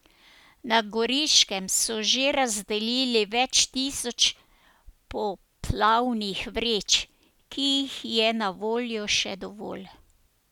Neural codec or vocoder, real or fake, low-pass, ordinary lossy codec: none; real; 19.8 kHz; none